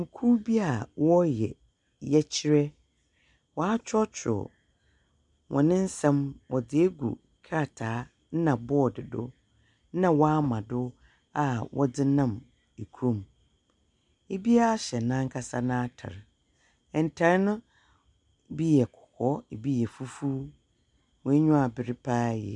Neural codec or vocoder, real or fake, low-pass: none; real; 10.8 kHz